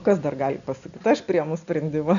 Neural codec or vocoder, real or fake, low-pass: none; real; 7.2 kHz